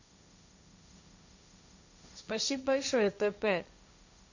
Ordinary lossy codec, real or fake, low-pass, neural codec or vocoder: none; fake; 7.2 kHz; codec, 16 kHz, 1.1 kbps, Voila-Tokenizer